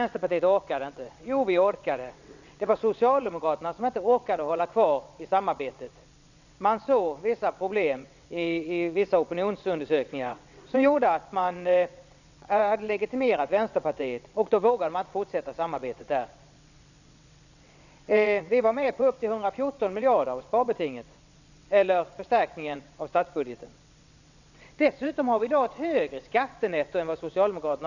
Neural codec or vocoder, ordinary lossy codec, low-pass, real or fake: vocoder, 22.05 kHz, 80 mel bands, WaveNeXt; none; 7.2 kHz; fake